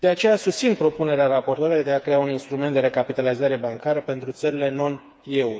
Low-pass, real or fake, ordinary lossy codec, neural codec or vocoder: none; fake; none; codec, 16 kHz, 4 kbps, FreqCodec, smaller model